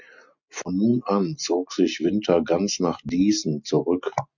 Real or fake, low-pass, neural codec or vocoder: real; 7.2 kHz; none